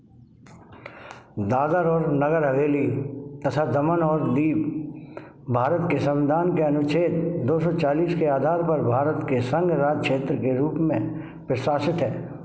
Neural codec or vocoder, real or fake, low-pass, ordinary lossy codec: none; real; none; none